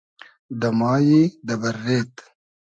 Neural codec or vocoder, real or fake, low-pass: none; real; 9.9 kHz